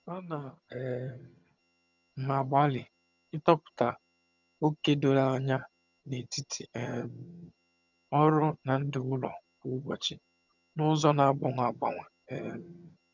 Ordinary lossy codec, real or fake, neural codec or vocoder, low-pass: none; fake; vocoder, 22.05 kHz, 80 mel bands, HiFi-GAN; 7.2 kHz